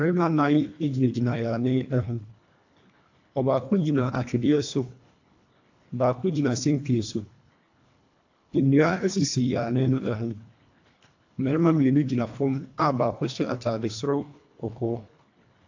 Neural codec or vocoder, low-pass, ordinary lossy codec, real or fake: codec, 24 kHz, 1.5 kbps, HILCodec; 7.2 kHz; AAC, 48 kbps; fake